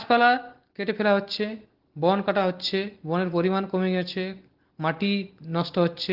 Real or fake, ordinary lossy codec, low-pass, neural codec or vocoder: real; Opus, 16 kbps; 5.4 kHz; none